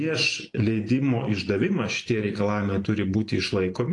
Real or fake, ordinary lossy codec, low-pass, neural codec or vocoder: fake; AAC, 48 kbps; 10.8 kHz; vocoder, 24 kHz, 100 mel bands, Vocos